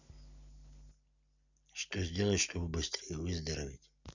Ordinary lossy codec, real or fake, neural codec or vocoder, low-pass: none; real; none; 7.2 kHz